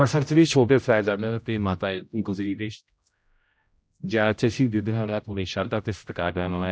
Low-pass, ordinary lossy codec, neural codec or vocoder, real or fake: none; none; codec, 16 kHz, 0.5 kbps, X-Codec, HuBERT features, trained on general audio; fake